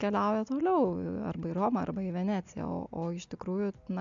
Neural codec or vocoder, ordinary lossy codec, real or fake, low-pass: none; MP3, 64 kbps; real; 7.2 kHz